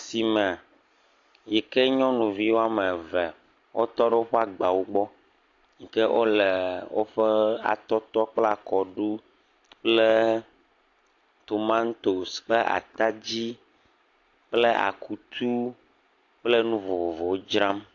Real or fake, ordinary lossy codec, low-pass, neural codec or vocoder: real; AAC, 48 kbps; 7.2 kHz; none